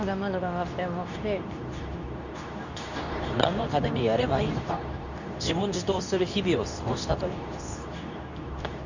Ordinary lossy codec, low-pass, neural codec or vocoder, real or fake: none; 7.2 kHz; codec, 24 kHz, 0.9 kbps, WavTokenizer, medium speech release version 2; fake